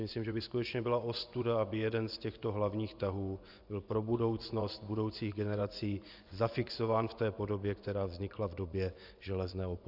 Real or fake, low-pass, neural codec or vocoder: fake; 5.4 kHz; vocoder, 24 kHz, 100 mel bands, Vocos